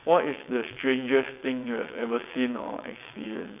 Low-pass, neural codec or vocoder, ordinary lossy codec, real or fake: 3.6 kHz; vocoder, 22.05 kHz, 80 mel bands, WaveNeXt; none; fake